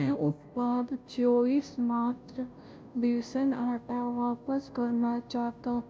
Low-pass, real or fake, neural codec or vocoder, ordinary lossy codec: none; fake; codec, 16 kHz, 0.5 kbps, FunCodec, trained on Chinese and English, 25 frames a second; none